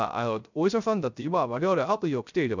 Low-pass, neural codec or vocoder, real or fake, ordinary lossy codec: 7.2 kHz; codec, 16 kHz, 0.3 kbps, FocalCodec; fake; none